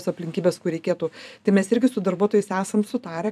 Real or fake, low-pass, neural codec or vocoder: fake; 14.4 kHz; vocoder, 44.1 kHz, 128 mel bands every 256 samples, BigVGAN v2